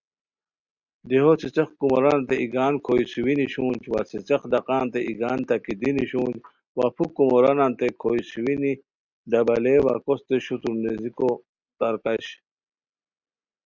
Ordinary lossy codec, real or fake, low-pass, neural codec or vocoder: Opus, 64 kbps; real; 7.2 kHz; none